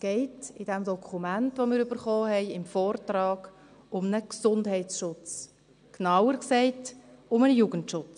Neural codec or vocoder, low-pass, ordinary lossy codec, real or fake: none; 9.9 kHz; none; real